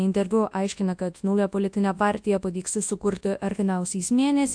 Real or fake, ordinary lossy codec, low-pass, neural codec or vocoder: fake; AAC, 64 kbps; 9.9 kHz; codec, 24 kHz, 0.9 kbps, WavTokenizer, large speech release